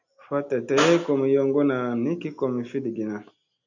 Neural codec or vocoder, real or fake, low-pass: none; real; 7.2 kHz